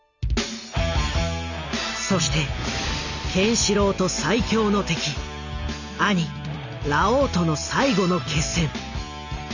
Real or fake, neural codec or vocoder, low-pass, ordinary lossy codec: real; none; 7.2 kHz; none